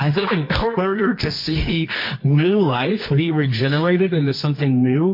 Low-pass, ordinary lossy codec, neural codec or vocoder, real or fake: 5.4 kHz; MP3, 32 kbps; codec, 24 kHz, 0.9 kbps, WavTokenizer, medium music audio release; fake